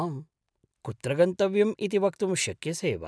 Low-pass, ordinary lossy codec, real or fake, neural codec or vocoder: none; none; real; none